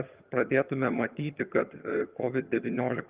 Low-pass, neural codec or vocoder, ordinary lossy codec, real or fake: 3.6 kHz; vocoder, 22.05 kHz, 80 mel bands, HiFi-GAN; Opus, 24 kbps; fake